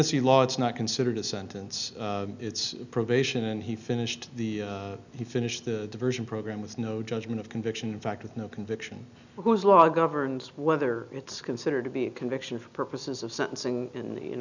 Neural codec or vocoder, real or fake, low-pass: none; real; 7.2 kHz